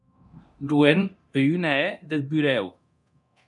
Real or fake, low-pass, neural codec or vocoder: fake; 10.8 kHz; codec, 24 kHz, 0.9 kbps, DualCodec